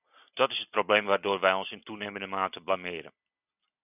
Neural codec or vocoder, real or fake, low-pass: none; real; 3.6 kHz